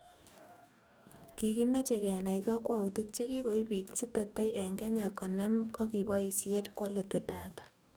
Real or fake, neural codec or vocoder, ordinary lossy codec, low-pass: fake; codec, 44.1 kHz, 2.6 kbps, DAC; none; none